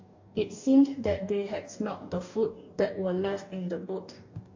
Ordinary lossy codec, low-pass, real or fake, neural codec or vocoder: AAC, 48 kbps; 7.2 kHz; fake; codec, 44.1 kHz, 2.6 kbps, DAC